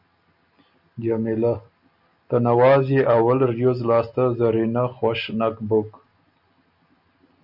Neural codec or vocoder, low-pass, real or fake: none; 5.4 kHz; real